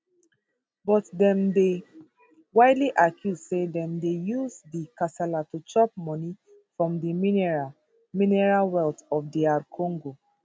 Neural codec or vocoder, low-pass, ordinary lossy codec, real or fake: none; none; none; real